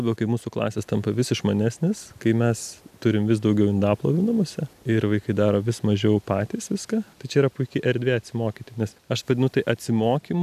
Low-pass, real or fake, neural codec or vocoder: 14.4 kHz; real; none